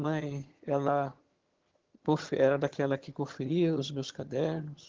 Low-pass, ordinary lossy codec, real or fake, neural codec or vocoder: 7.2 kHz; Opus, 16 kbps; fake; vocoder, 22.05 kHz, 80 mel bands, HiFi-GAN